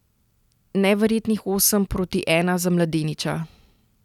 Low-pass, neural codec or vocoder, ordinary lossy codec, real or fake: 19.8 kHz; none; none; real